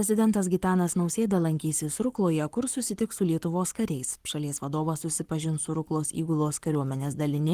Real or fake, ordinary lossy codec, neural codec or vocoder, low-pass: fake; Opus, 24 kbps; codec, 44.1 kHz, 7.8 kbps, Pupu-Codec; 14.4 kHz